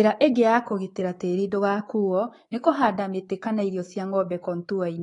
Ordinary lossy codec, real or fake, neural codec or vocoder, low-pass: AAC, 32 kbps; fake; codec, 24 kHz, 3.1 kbps, DualCodec; 10.8 kHz